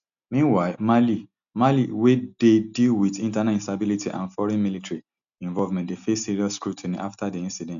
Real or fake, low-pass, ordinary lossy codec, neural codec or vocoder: real; 7.2 kHz; none; none